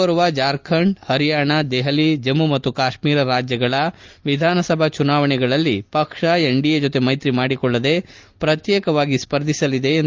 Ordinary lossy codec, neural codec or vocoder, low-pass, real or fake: Opus, 32 kbps; none; 7.2 kHz; real